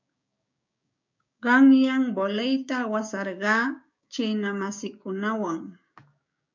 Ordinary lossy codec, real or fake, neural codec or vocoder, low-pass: MP3, 48 kbps; fake; codec, 16 kHz, 6 kbps, DAC; 7.2 kHz